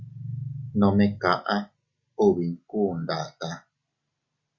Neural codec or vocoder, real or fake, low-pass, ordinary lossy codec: none; real; 7.2 kHz; Opus, 64 kbps